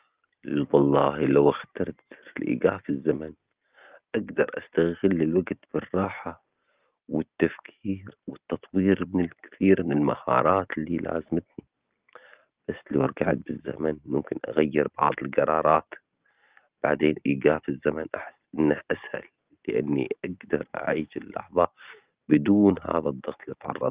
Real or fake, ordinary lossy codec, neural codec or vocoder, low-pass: real; Opus, 32 kbps; none; 3.6 kHz